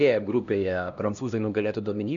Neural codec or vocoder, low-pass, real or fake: codec, 16 kHz, 1 kbps, X-Codec, HuBERT features, trained on LibriSpeech; 7.2 kHz; fake